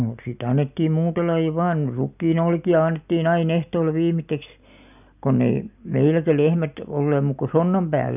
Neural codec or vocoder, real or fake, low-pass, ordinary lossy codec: none; real; 3.6 kHz; none